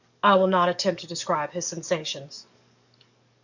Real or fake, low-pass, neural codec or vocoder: fake; 7.2 kHz; codec, 44.1 kHz, 7.8 kbps, DAC